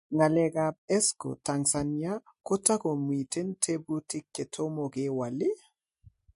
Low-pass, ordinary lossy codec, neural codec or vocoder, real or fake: 10.8 kHz; MP3, 48 kbps; none; real